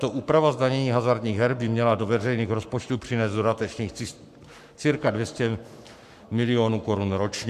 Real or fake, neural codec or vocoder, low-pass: fake; codec, 44.1 kHz, 7.8 kbps, Pupu-Codec; 14.4 kHz